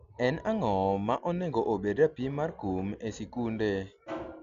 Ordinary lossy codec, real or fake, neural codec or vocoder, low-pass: none; real; none; 7.2 kHz